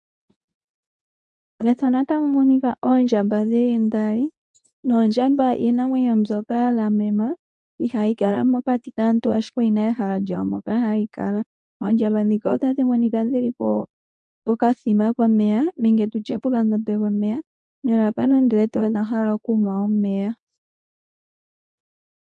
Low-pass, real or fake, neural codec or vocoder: 10.8 kHz; fake; codec, 24 kHz, 0.9 kbps, WavTokenizer, medium speech release version 2